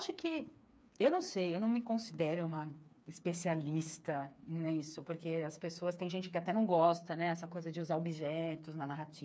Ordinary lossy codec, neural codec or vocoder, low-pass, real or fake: none; codec, 16 kHz, 4 kbps, FreqCodec, smaller model; none; fake